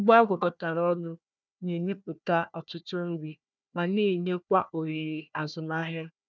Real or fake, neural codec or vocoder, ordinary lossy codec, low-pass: fake; codec, 16 kHz, 1 kbps, FunCodec, trained on Chinese and English, 50 frames a second; none; none